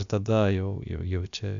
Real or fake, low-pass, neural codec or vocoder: fake; 7.2 kHz; codec, 16 kHz, about 1 kbps, DyCAST, with the encoder's durations